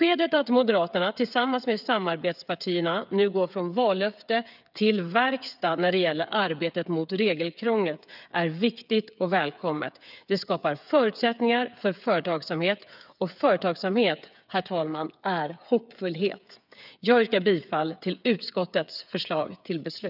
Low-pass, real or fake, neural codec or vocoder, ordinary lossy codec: 5.4 kHz; fake; codec, 16 kHz, 8 kbps, FreqCodec, smaller model; none